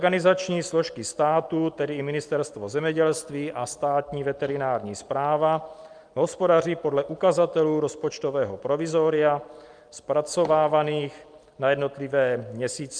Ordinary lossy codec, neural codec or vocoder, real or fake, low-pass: Opus, 32 kbps; none; real; 9.9 kHz